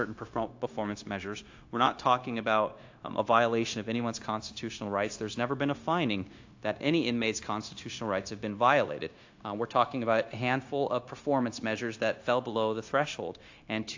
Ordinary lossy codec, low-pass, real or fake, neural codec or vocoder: AAC, 48 kbps; 7.2 kHz; fake; codec, 16 kHz, 0.9 kbps, LongCat-Audio-Codec